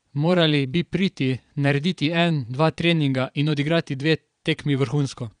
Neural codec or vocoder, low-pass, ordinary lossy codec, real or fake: vocoder, 22.05 kHz, 80 mel bands, Vocos; 9.9 kHz; none; fake